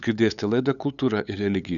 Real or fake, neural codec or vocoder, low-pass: fake; codec, 16 kHz, 8 kbps, FunCodec, trained on LibriTTS, 25 frames a second; 7.2 kHz